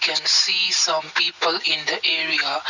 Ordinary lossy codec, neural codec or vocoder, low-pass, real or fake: none; none; 7.2 kHz; real